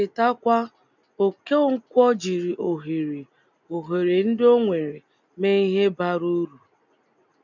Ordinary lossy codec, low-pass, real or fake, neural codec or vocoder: none; 7.2 kHz; real; none